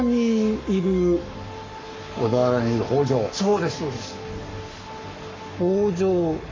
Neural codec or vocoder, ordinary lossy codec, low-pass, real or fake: codec, 44.1 kHz, 7.8 kbps, Pupu-Codec; MP3, 48 kbps; 7.2 kHz; fake